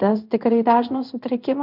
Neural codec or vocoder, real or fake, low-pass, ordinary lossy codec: codec, 16 kHz in and 24 kHz out, 1 kbps, XY-Tokenizer; fake; 5.4 kHz; AAC, 48 kbps